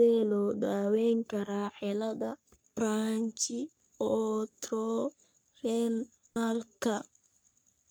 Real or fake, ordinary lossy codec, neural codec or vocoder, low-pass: fake; none; codec, 44.1 kHz, 3.4 kbps, Pupu-Codec; none